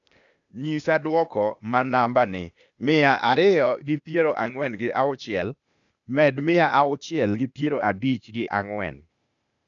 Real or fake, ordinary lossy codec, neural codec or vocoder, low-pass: fake; none; codec, 16 kHz, 0.8 kbps, ZipCodec; 7.2 kHz